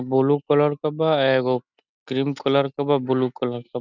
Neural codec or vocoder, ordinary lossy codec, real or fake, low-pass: none; none; real; 7.2 kHz